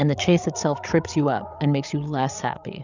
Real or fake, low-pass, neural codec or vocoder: fake; 7.2 kHz; codec, 16 kHz, 8 kbps, FreqCodec, larger model